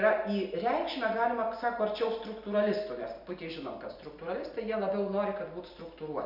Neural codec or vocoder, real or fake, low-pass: none; real; 5.4 kHz